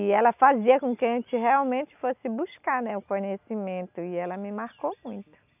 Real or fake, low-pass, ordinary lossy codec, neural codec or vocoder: real; 3.6 kHz; none; none